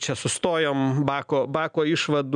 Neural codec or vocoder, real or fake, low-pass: none; real; 9.9 kHz